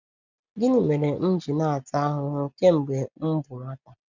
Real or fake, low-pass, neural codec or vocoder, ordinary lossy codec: real; 7.2 kHz; none; none